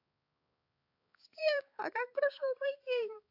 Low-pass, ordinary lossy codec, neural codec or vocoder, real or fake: 5.4 kHz; AAC, 32 kbps; codec, 16 kHz, 4 kbps, X-Codec, HuBERT features, trained on balanced general audio; fake